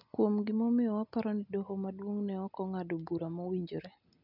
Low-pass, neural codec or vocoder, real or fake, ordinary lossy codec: 5.4 kHz; none; real; none